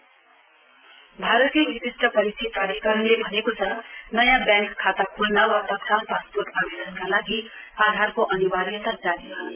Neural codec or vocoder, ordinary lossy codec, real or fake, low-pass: none; Opus, 32 kbps; real; 3.6 kHz